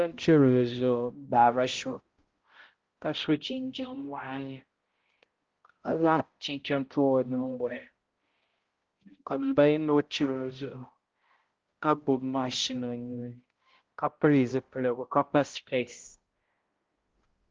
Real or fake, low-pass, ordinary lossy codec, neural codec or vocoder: fake; 7.2 kHz; Opus, 16 kbps; codec, 16 kHz, 0.5 kbps, X-Codec, HuBERT features, trained on balanced general audio